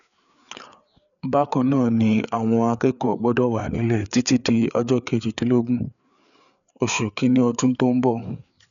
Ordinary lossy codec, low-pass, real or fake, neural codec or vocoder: none; 7.2 kHz; fake; codec, 16 kHz, 4 kbps, FreqCodec, larger model